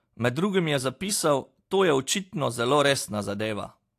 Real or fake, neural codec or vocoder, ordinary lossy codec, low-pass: real; none; AAC, 64 kbps; 14.4 kHz